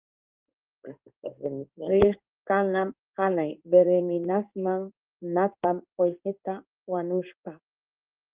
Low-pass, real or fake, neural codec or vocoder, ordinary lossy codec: 3.6 kHz; fake; codec, 16 kHz in and 24 kHz out, 1 kbps, XY-Tokenizer; Opus, 32 kbps